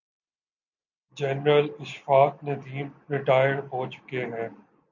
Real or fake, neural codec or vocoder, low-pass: real; none; 7.2 kHz